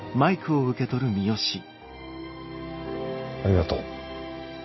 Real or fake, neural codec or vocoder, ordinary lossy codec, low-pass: real; none; MP3, 24 kbps; 7.2 kHz